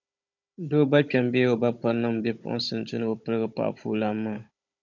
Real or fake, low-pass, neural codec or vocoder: fake; 7.2 kHz; codec, 16 kHz, 16 kbps, FunCodec, trained on Chinese and English, 50 frames a second